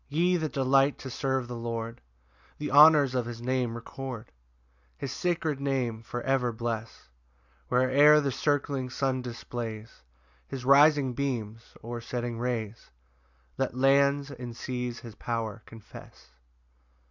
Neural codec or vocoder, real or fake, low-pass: none; real; 7.2 kHz